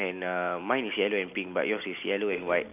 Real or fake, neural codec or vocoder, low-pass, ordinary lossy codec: real; none; 3.6 kHz; none